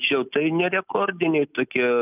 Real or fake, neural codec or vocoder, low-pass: real; none; 3.6 kHz